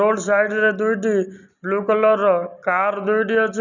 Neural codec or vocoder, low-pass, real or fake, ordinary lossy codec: none; 7.2 kHz; real; none